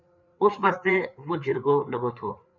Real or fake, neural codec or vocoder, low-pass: fake; codec, 16 kHz, 4 kbps, FreqCodec, larger model; 7.2 kHz